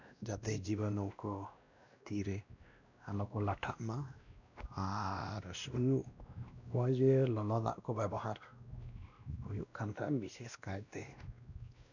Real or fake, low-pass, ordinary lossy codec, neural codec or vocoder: fake; 7.2 kHz; none; codec, 16 kHz, 1 kbps, X-Codec, WavLM features, trained on Multilingual LibriSpeech